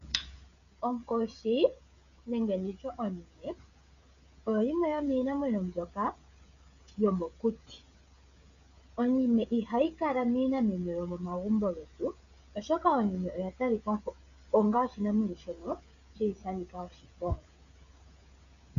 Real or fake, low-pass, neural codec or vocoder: fake; 7.2 kHz; codec, 16 kHz, 16 kbps, FreqCodec, smaller model